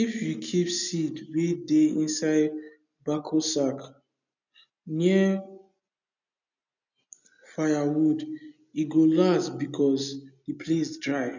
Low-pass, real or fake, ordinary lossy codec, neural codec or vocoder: 7.2 kHz; real; none; none